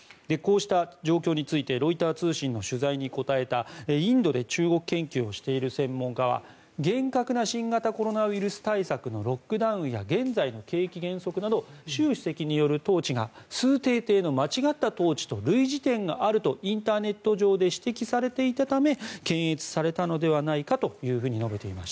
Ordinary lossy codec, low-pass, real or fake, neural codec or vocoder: none; none; real; none